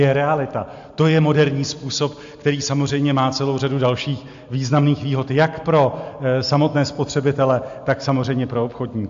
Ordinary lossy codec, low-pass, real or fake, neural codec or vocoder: AAC, 64 kbps; 7.2 kHz; real; none